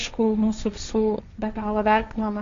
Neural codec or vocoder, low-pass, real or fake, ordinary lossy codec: codec, 16 kHz, 1.1 kbps, Voila-Tokenizer; 7.2 kHz; fake; Opus, 64 kbps